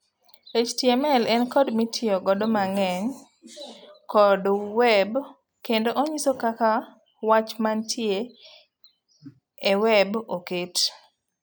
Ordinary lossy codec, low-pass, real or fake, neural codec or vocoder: none; none; real; none